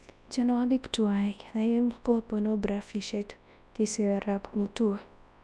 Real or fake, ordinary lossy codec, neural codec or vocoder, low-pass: fake; none; codec, 24 kHz, 0.9 kbps, WavTokenizer, large speech release; none